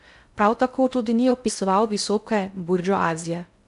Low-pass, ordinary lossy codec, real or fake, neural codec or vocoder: 10.8 kHz; MP3, 96 kbps; fake; codec, 16 kHz in and 24 kHz out, 0.6 kbps, FocalCodec, streaming, 2048 codes